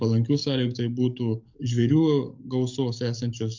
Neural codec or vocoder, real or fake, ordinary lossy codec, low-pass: codec, 44.1 kHz, 7.8 kbps, DAC; fake; MP3, 64 kbps; 7.2 kHz